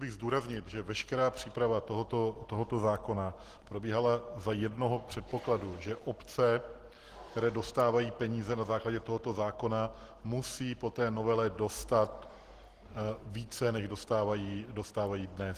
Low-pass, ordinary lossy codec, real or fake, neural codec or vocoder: 14.4 kHz; Opus, 16 kbps; real; none